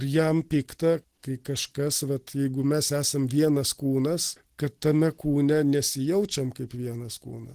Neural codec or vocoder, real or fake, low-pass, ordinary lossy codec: none; real; 14.4 kHz; Opus, 16 kbps